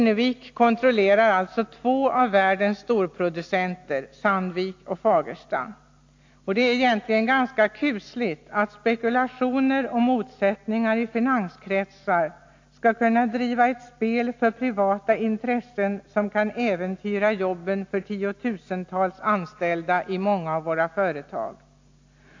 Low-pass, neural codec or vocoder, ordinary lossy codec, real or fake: 7.2 kHz; none; AAC, 48 kbps; real